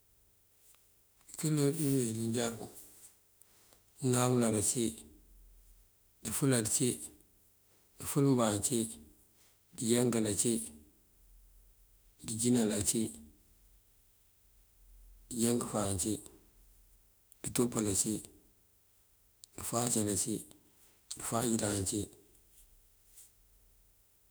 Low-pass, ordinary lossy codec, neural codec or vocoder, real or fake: none; none; autoencoder, 48 kHz, 32 numbers a frame, DAC-VAE, trained on Japanese speech; fake